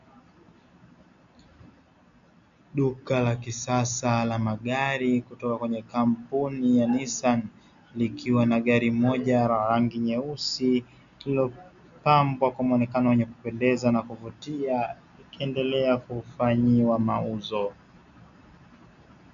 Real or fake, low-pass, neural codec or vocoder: real; 7.2 kHz; none